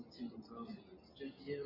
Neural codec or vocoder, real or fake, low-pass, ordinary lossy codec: none; real; 5.4 kHz; AAC, 48 kbps